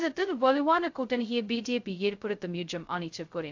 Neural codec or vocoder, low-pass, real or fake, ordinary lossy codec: codec, 16 kHz, 0.2 kbps, FocalCodec; 7.2 kHz; fake; AAC, 48 kbps